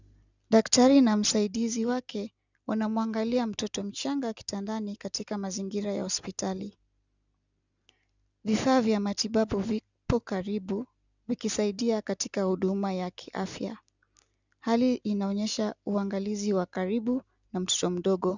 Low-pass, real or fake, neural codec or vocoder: 7.2 kHz; real; none